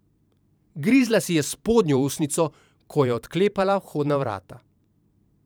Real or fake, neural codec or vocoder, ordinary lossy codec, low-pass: fake; vocoder, 44.1 kHz, 128 mel bands every 512 samples, BigVGAN v2; none; none